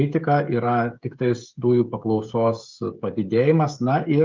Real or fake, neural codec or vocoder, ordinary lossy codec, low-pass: fake; codec, 16 kHz, 16 kbps, FreqCodec, smaller model; Opus, 32 kbps; 7.2 kHz